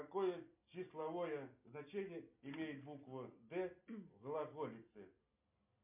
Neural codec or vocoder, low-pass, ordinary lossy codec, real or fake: none; 3.6 kHz; MP3, 32 kbps; real